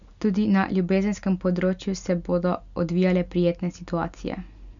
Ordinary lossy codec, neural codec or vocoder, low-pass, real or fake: none; none; 7.2 kHz; real